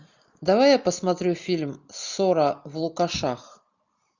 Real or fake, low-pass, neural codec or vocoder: real; 7.2 kHz; none